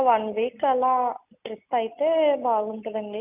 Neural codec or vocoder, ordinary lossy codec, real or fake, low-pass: none; none; real; 3.6 kHz